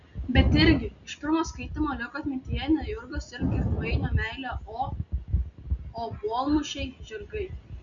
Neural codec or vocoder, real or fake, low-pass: none; real; 7.2 kHz